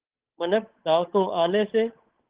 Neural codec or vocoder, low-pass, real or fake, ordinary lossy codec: codec, 16 kHz, 8 kbps, FunCodec, trained on Chinese and English, 25 frames a second; 3.6 kHz; fake; Opus, 16 kbps